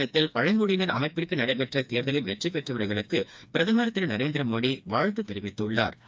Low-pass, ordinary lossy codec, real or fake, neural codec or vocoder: none; none; fake; codec, 16 kHz, 2 kbps, FreqCodec, smaller model